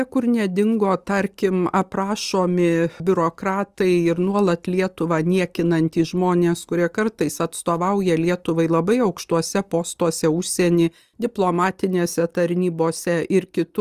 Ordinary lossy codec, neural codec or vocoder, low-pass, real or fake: Opus, 32 kbps; none; 14.4 kHz; real